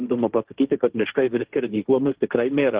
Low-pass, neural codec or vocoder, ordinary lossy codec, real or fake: 3.6 kHz; codec, 16 kHz, 1.1 kbps, Voila-Tokenizer; Opus, 16 kbps; fake